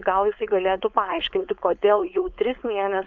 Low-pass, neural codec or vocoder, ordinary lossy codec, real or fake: 7.2 kHz; codec, 16 kHz, 4.8 kbps, FACodec; MP3, 96 kbps; fake